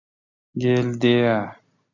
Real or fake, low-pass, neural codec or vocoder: real; 7.2 kHz; none